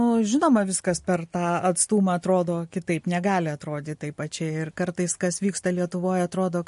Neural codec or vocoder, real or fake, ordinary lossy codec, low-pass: none; real; MP3, 48 kbps; 10.8 kHz